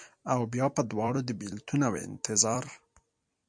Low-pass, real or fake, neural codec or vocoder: 9.9 kHz; fake; vocoder, 44.1 kHz, 128 mel bands every 256 samples, BigVGAN v2